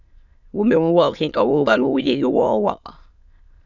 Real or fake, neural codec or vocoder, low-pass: fake; autoencoder, 22.05 kHz, a latent of 192 numbers a frame, VITS, trained on many speakers; 7.2 kHz